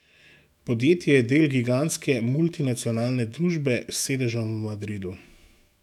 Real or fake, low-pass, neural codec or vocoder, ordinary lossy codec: fake; 19.8 kHz; autoencoder, 48 kHz, 128 numbers a frame, DAC-VAE, trained on Japanese speech; none